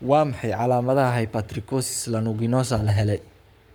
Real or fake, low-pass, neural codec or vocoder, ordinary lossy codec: fake; none; codec, 44.1 kHz, 7.8 kbps, Pupu-Codec; none